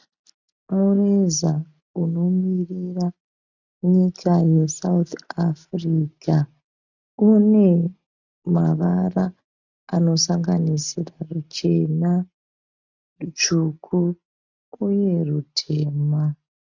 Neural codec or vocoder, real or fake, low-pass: none; real; 7.2 kHz